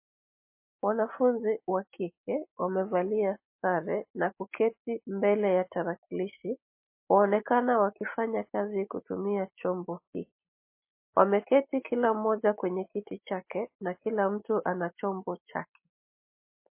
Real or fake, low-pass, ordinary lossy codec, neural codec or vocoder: real; 3.6 kHz; MP3, 24 kbps; none